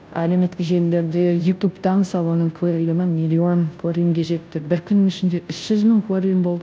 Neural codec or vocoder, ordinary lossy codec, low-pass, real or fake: codec, 16 kHz, 0.5 kbps, FunCodec, trained on Chinese and English, 25 frames a second; none; none; fake